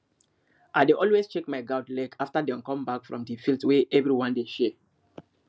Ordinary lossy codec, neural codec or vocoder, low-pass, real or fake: none; none; none; real